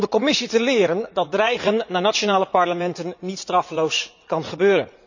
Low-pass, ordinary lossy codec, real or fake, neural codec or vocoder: 7.2 kHz; none; fake; vocoder, 44.1 kHz, 80 mel bands, Vocos